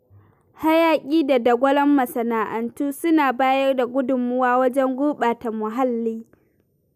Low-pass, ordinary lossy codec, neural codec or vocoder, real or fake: 14.4 kHz; none; none; real